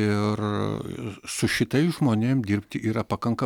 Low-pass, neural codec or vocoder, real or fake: 19.8 kHz; none; real